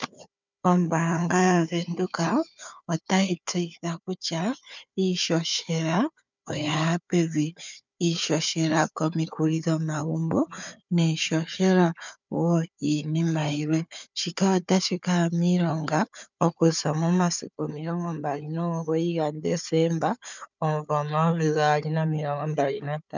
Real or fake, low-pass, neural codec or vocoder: fake; 7.2 kHz; codec, 16 kHz, 4 kbps, FunCodec, trained on Chinese and English, 50 frames a second